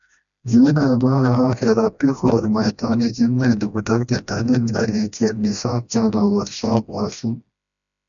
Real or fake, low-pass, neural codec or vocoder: fake; 7.2 kHz; codec, 16 kHz, 1 kbps, FreqCodec, smaller model